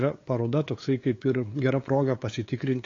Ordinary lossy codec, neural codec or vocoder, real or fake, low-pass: AAC, 32 kbps; codec, 16 kHz, 8 kbps, FunCodec, trained on LibriTTS, 25 frames a second; fake; 7.2 kHz